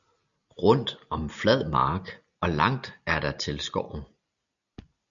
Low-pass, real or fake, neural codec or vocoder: 7.2 kHz; real; none